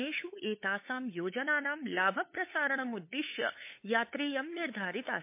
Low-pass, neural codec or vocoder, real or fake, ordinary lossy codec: 3.6 kHz; codec, 16 kHz, 8 kbps, FunCodec, trained on LibriTTS, 25 frames a second; fake; MP3, 24 kbps